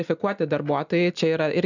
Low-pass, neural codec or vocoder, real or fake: 7.2 kHz; none; real